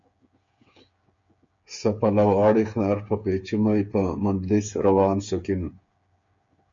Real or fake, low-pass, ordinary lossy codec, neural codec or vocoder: fake; 7.2 kHz; MP3, 48 kbps; codec, 16 kHz, 8 kbps, FreqCodec, smaller model